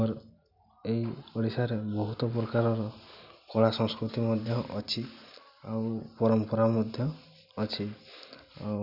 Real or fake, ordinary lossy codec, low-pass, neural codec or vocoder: real; none; 5.4 kHz; none